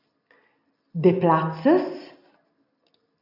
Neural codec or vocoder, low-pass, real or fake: none; 5.4 kHz; real